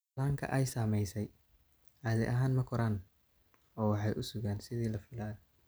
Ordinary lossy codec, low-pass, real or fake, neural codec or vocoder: none; none; real; none